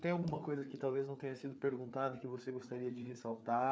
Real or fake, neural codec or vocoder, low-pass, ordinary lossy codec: fake; codec, 16 kHz, 4 kbps, FreqCodec, larger model; none; none